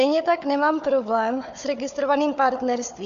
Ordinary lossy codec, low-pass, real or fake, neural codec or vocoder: MP3, 64 kbps; 7.2 kHz; fake; codec, 16 kHz, 4 kbps, FunCodec, trained on Chinese and English, 50 frames a second